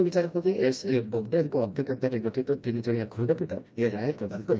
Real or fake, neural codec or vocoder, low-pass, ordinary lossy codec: fake; codec, 16 kHz, 1 kbps, FreqCodec, smaller model; none; none